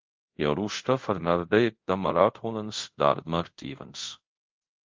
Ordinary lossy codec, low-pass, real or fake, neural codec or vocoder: Opus, 32 kbps; 7.2 kHz; fake; codec, 24 kHz, 0.5 kbps, DualCodec